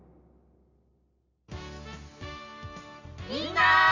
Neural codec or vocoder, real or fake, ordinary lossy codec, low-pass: none; real; Opus, 64 kbps; 7.2 kHz